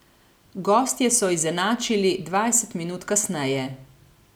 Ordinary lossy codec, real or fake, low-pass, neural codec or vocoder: none; real; none; none